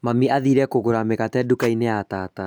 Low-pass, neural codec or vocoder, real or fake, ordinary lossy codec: none; none; real; none